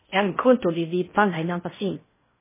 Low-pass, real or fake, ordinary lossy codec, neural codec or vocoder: 3.6 kHz; fake; MP3, 16 kbps; codec, 16 kHz in and 24 kHz out, 0.6 kbps, FocalCodec, streaming, 4096 codes